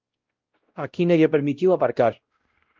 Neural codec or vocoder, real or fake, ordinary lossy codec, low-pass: codec, 16 kHz, 0.5 kbps, X-Codec, WavLM features, trained on Multilingual LibriSpeech; fake; Opus, 32 kbps; 7.2 kHz